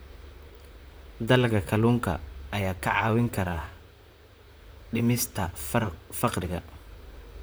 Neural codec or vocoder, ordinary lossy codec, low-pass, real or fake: vocoder, 44.1 kHz, 128 mel bands, Pupu-Vocoder; none; none; fake